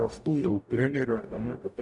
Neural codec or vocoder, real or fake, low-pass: codec, 44.1 kHz, 0.9 kbps, DAC; fake; 10.8 kHz